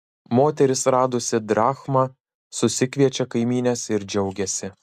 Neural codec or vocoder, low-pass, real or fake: none; 14.4 kHz; real